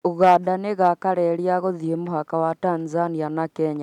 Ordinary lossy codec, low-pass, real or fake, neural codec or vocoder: none; 19.8 kHz; real; none